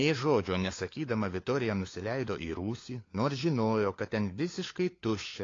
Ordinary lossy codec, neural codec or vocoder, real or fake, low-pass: AAC, 32 kbps; codec, 16 kHz, 4 kbps, FunCodec, trained on LibriTTS, 50 frames a second; fake; 7.2 kHz